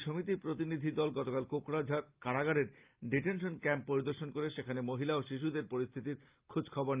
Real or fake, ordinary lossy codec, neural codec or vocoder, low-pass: real; Opus, 32 kbps; none; 3.6 kHz